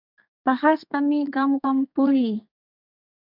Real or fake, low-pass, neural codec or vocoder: fake; 5.4 kHz; codec, 32 kHz, 1.9 kbps, SNAC